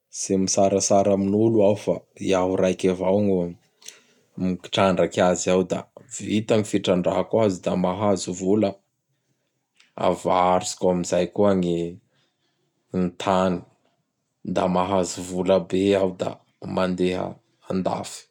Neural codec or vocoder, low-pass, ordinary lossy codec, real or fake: none; 19.8 kHz; none; real